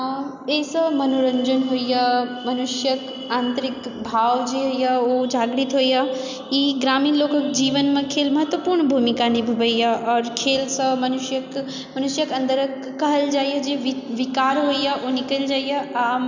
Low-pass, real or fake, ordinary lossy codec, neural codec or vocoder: 7.2 kHz; real; none; none